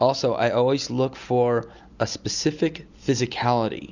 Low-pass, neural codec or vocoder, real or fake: 7.2 kHz; none; real